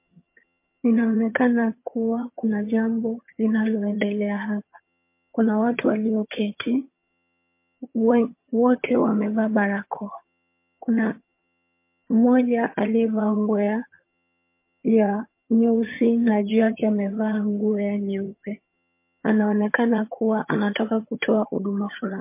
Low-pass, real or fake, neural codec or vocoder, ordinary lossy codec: 3.6 kHz; fake; vocoder, 22.05 kHz, 80 mel bands, HiFi-GAN; MP3, 24 kbps